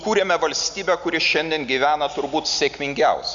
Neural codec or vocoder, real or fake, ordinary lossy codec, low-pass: none; real; MP3, 96 kbps; 7.2 kHz